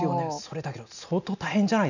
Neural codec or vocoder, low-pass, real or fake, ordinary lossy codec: none; 7.2 kHz; real; none